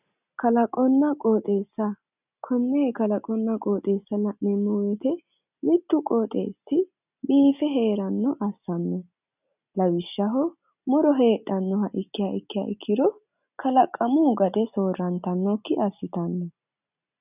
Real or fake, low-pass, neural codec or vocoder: real; 3.6 kHz; none